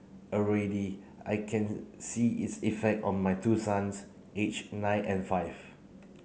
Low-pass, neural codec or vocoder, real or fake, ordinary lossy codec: none; none; real; none